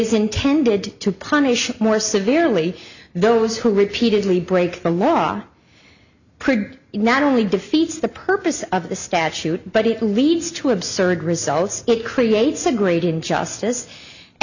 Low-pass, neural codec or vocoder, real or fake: 7.2 kHz; none; real